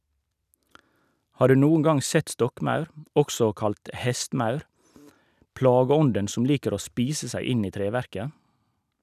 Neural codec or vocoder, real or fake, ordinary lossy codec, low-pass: none; real; none; 14.4 kHz